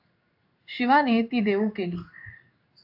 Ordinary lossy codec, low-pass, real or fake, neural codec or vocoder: AAC, 48 kbps; 5.4 kHz; fake; codec, 16 kHz in and 24 kHz out, 1 kbps, XY-Tokenizer